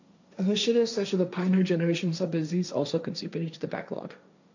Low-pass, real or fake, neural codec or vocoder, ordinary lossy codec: 7.2 kHz; fake; codec, 16 kHz, 1.1 kbps, Voila-Tokenizer; none